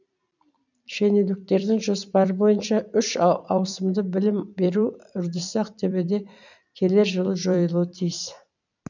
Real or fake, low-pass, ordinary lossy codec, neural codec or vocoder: fake; 7.2 kHz; none; vocoder, 44.1 kHz, 128 mel bands every 256 samples, BigVGAN v2